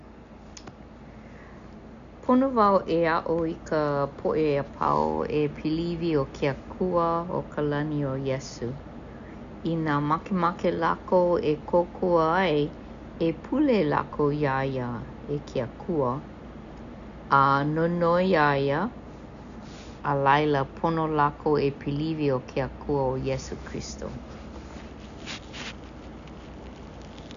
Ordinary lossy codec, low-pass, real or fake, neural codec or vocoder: none; 7.2 kHz; real; none